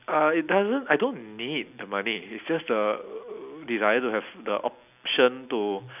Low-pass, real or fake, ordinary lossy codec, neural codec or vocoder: 3.6 kHz; real; none; none